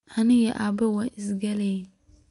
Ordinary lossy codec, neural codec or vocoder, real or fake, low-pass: none; none; real; 10.8 kHz